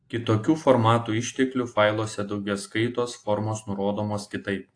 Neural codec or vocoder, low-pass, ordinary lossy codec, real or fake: none; 9.9 kHz; AAC, 48 kbps; real